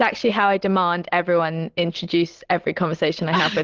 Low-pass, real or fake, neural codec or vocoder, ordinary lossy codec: 7.2 kHz; real; none; Opus, 16 kbps